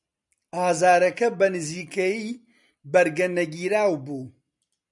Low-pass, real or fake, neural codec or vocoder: 10.8 kHz; real; none